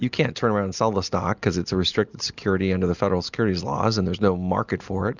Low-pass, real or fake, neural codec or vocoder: 7.2 kHz; real; none